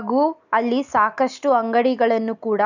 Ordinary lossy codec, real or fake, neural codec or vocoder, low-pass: none; real; none; 7.2 kHz